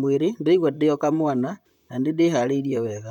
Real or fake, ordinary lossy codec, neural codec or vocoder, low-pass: fake; none; vocoder, 44.1 kHz, 128 mel bands, Pupu-Vocoder; 19.8 kHz